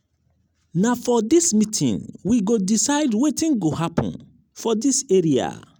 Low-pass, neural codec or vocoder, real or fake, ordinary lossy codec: none; none; real; none